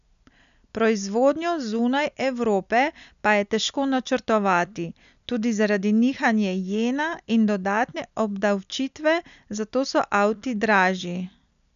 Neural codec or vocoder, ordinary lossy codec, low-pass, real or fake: none; none; 7.2 kHz; real